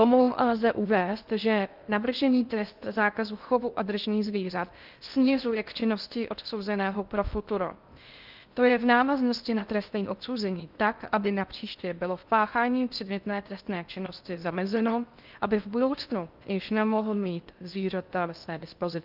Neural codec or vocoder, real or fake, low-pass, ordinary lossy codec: codec, 16 kHz in and 24 kHz out, 0.6 kbps, FocalCodec, streaming, 2048 codes; fake; 5.4 kHz; Opus, 24 kbps